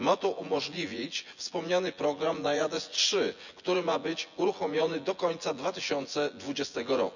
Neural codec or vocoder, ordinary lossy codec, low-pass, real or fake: vocoder, 24 kHz, 100 mel bands, Vocos; none; 7.2 kHz; fake